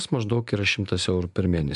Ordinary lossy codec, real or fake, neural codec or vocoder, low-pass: MP3, 96 kbps; real; none; 10.8 kHz